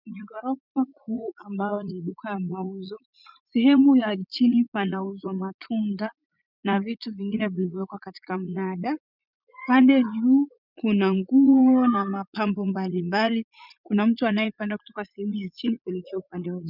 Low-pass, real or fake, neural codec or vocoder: 5.4 kHz; fake; vocoder, 44.1 kHz, 80 mel bands, Vocos